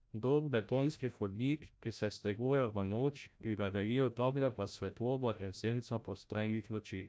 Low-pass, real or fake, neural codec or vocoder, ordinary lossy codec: none; fake; codec, 16 kHz, 0.5 kbps, FreqCodec, larger model; none